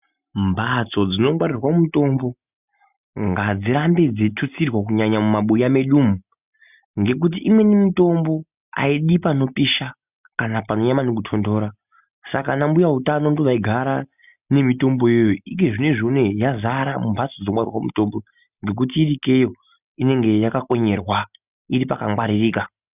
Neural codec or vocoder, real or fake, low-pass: none; real; 3.6 kHz